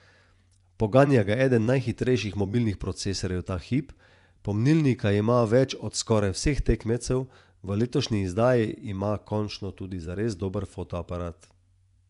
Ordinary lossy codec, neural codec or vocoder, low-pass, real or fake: none; vocoder, 24 kHz, 100 mel bands, Vocos; 10.8 kHz; fake